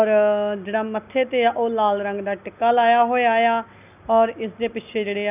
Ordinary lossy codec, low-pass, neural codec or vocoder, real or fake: none; 3.6 kHz; none; real